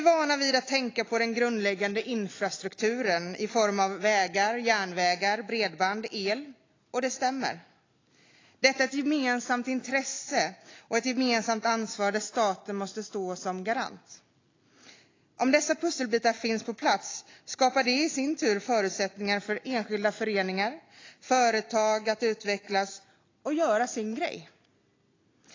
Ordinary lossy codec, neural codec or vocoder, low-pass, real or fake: AAC, 32 kbps; none; 7.2 kHz; real